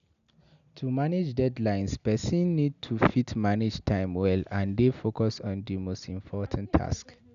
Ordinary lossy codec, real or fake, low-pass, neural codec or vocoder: none; real; 7.2 kHz; none